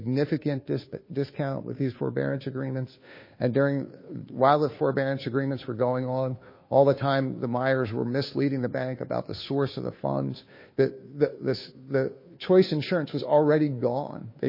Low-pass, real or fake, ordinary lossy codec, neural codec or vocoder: 5.4 kHz; fake; MP3, 24 kbps; codec, 16 kHz, 2 kbps, FunCodec, trained on Chinese and English, 25 frames a second